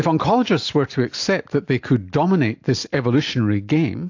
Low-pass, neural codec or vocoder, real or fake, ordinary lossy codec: 7.2 kHz; none; real; AAC, 48 kbps